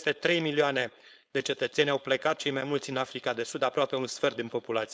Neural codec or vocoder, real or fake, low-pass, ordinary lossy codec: codec, 16 kHz, 4.8 kbps, FACodec; fake; none; none